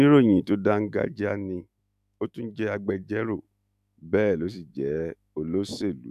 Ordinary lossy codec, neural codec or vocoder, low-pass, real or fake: none; autoencoder, 48 kHz, 128 numbers a frame, DAC-VAE, trained on Japanese speech; 14.4 kHz; fake